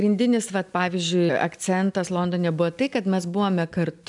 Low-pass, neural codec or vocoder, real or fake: 10.8 kHz; none; real